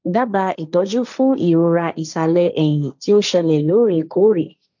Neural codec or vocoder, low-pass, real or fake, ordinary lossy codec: codec, 16 kHz, 1.1 kbps, Voila-Tokenizer; 7.2 kHz; fake; none